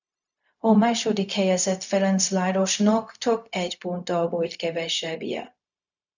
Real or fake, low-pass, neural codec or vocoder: fake; 7.2 kHz; codec, 16 kHz, 0.4 kbps, LongCat-Audio-Codec